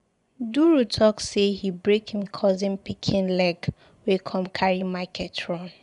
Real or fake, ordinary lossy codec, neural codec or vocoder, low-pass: fake; none; vocoder, 24 kHz, 100 mel bands, Vocos; 10.8 kHz